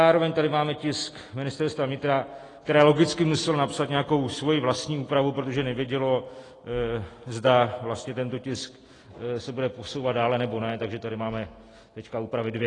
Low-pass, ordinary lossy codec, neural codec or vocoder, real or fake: 10.8 kHz; AAC, 32 kbps; none; real